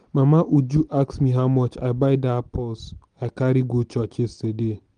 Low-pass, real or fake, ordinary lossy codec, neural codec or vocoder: 9.9 kHz; real; Opus, 24 kbps; none